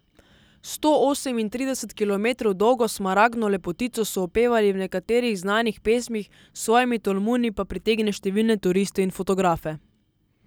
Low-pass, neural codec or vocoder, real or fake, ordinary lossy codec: none; none; real; none